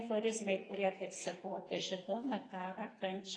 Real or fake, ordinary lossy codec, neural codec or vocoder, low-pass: fake; AAC, 32 kbps; codec, 44.1 kHz, 2.6 kbps, SNAC; 9.9 kHz